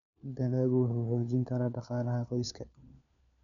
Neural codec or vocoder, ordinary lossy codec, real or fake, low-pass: codec, 16 kHz, 4 kbps, FunCodec, trained on LibriTTS, 50 frames a second; none; fake; 7.2 kHz